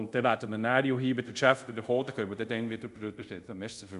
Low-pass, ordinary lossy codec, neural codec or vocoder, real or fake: 10.8 kHz; none; codec, 24 kHz, 0.5 kbps, DualCodec; fake